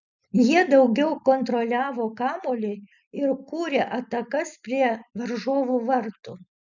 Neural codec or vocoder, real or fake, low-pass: none; real; 7.2 kHz